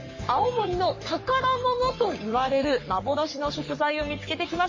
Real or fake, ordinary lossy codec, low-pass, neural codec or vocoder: fake; MP3, 32 kbps; 7.2 kHz; codec, 44.1 kHz, 3.4 kbps, Pupu-Codec